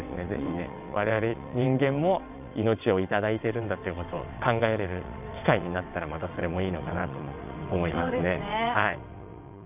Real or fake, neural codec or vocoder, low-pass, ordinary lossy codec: fake; vocoder, 22.05 kHz, 80 mel bands, WaveNeXt; 3.6 kHz; none